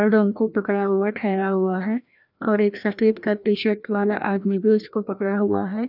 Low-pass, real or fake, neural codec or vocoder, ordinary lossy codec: 5.4 kHz; fake; codec, 16 kHz, 1 kbps, FreqCodec, larger model; none